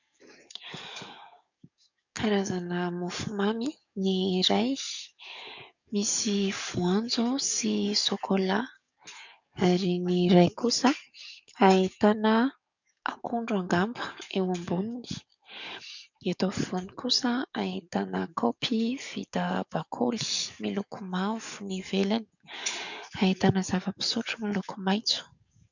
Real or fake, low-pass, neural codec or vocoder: fake; 7.2 kHz; codec, 44.1 kHz, 7.8 kbps, DAC